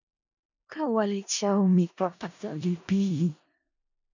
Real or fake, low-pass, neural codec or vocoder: fake; 7.2 kHz; codec, 16 kHz in and 24 kHz out, 0.4 kbps, LongCat-Audio-Codec, four codebook decoder